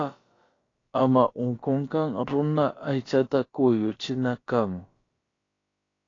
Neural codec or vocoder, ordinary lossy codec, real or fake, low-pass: codec, 16 kHz, about 1 kbps, DyCAST, with the encoder's durations; AAC, 32 kbps; fake; 7.2 kHz